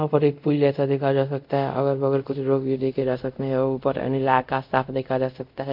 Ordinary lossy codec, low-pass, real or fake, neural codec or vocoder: MP3, 48 kbps; 5.4 kHz; fake; codec, 24 kHz, 0.5 kbps, DualCodec